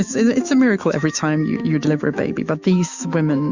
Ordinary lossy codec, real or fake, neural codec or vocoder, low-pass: Opus, 64 kbps; fake; autoencoder, 48 kHz, 128 numbers a frame, DAC-VAE, trained on Japanese speech; 7.2 kHz